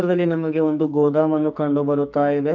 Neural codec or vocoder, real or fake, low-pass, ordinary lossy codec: codec, 44.1 kHz, 2.6 kbps, SNAC; fake; 7.2 kHz; none